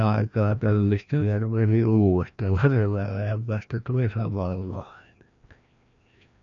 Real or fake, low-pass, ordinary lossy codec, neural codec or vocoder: fake; 7.2 kHz; none; codec, 16 kHz, 1 kbps, FreqCodec, larger model